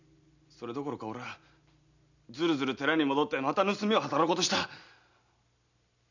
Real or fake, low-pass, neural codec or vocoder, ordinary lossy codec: real; 7.2 kHz; none; none